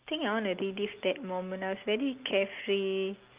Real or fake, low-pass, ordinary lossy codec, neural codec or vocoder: real; 3.6 kHz; Opus, 24 kbps; none